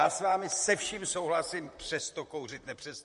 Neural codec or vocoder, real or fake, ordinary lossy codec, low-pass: vocoder, 44.1 kHz, 128 mel bands, Pupu-Vocoder; fake; MP3, 48 kbps; 14.4 kHz